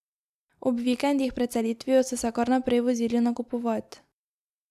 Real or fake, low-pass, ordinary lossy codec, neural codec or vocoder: real; 14.4 kHz; none; none